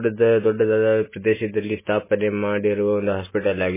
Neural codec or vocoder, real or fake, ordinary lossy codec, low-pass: none; real; MP3, 16 kbps; 3.6 kHz